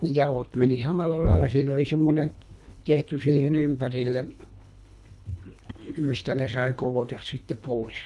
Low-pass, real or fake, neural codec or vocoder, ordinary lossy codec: none; fake; codec, 24 kHz, 1.5 kbps, HILCodec; none